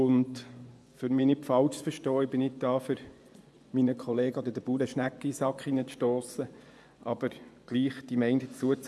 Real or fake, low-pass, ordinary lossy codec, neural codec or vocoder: fake; none; none; vocoder, 24 kHz, 100 mel bands, Vocos